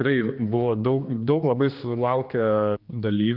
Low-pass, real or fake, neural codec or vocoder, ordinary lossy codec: 5.4 kHz; fake; codec, 16 kHz, 2 kbps, X-Codec, HuBERT features, trained on general audio; Opus, 24 kbps